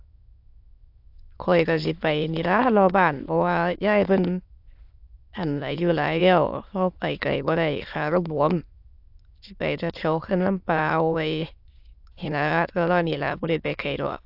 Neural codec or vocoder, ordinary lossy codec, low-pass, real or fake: autoencoder, 22.05 kHz, a latent of 192 numbers a frame, VITS, trained on many speakers; AAC, 48 kbps; 5.4 kHz; fake